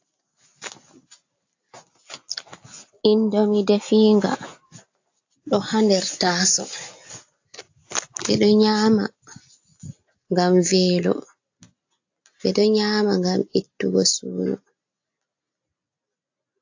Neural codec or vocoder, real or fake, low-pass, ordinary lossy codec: none; real; 7.2 kHz; AAC, 48 kbps